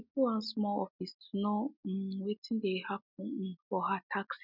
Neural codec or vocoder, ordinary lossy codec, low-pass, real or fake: none; none; 5.4 kHz; real